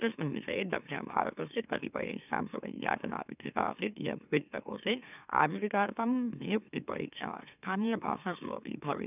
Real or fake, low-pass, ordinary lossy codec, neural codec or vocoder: fake; 3.6 kHz; none; autoencoder, 44.1 kHz, a latent of 192 numbers a frame, MeloTTS